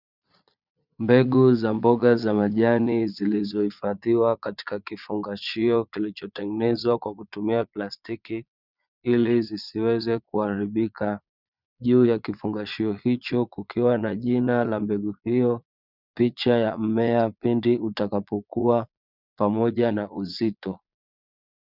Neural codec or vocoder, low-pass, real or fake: vocoder, 22.05 kHz, 80 mel bands, Vocos; 5.4 kHz; fake